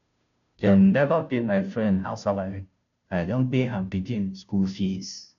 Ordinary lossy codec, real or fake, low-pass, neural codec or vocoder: none; fake; 7.2 kHz; codec, 16 kHz, 0.5 kbps, FunCodec, trained on Chinese and English, 25 frames a second